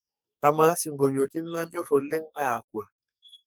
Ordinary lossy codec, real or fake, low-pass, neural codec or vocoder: none; fake; none; codec, 44.1 kHz, 2.6 kbps, SNAC